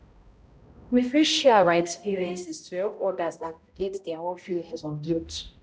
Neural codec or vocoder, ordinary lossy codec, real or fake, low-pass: codec, 16 kHz, 0.5 kbps, X-Codec, HuBERT features, trained on balanced general audio; none; fake; none